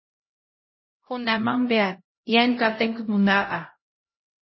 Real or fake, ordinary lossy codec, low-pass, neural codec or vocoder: fake; MP3, 24 kbps; 7.2 kHz; codec, 16 kHz, 0.5 kbps, X-Codec, HuBERT features, trained on LibriSpeech